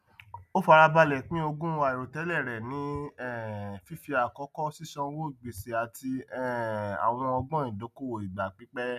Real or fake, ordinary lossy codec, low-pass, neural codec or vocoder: real; none; 14.4 kHz; none